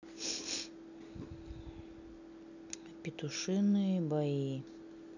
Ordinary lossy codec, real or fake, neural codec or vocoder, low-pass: none; real; none; 7.2 kHz